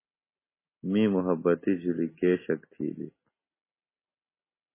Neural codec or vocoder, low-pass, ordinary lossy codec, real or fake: none; 3.6 kHz; MP3, 16 kbps; real